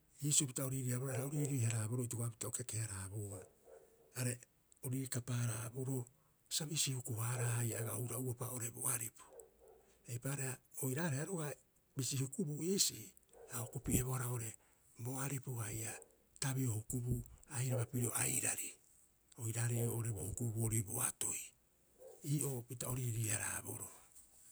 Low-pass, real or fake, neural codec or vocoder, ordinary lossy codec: none; real; none; none